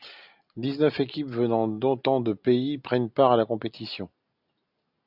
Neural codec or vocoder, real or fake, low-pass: none; real; 5.4 kHz